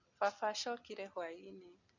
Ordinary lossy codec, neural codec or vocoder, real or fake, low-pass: none; none; real; 7.2 kHz